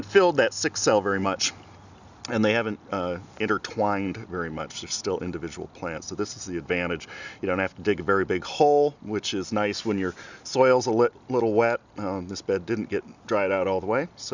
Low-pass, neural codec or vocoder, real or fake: 7.2 kHz; none; real